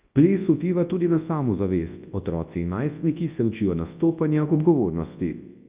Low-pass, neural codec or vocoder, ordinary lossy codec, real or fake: 3.6 kHz; codec, 24 kHz, 0.9 kbps, WavTokenizer, large speech release; Opus, 24 kbps; fake